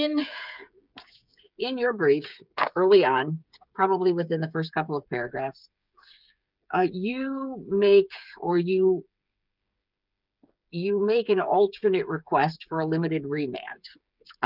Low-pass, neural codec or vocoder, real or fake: 5.4 kHz; codec, 16 kHz, 8 kbps, FreqCodec, smaller model; fake